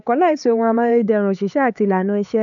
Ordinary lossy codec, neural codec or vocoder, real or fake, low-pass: none; codec, 16 kHz, 4 kbps, X-Codec, HuBERT features, trained on LibriSpeech; fake; 7.2 kHz